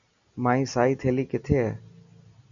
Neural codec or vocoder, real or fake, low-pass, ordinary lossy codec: none; real; 7.2 kHz; MP3, 96 kbps